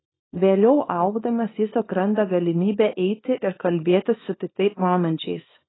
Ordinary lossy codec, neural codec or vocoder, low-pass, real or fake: AAC, 16 kbps; codec, 24 kHz, 0.9 kbps, WavTokenizer, small release; 7.2 kHz; fake